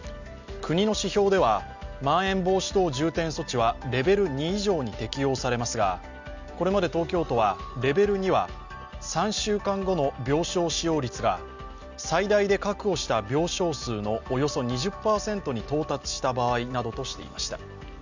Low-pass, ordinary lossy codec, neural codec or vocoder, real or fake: 7.2 kHz; Opus, 64 kbps; none; real